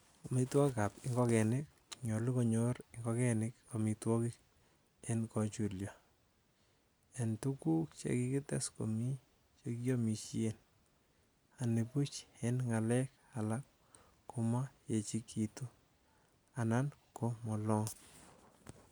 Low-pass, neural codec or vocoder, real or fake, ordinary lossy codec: none; none; real; none